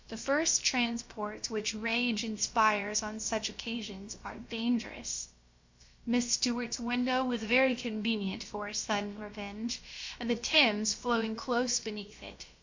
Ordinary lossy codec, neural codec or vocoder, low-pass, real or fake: MP3, 48 kbps; codec, 16 kHz, about 1 kbps, DyCAST, with the encoder's durations; 7.2 kHz; fake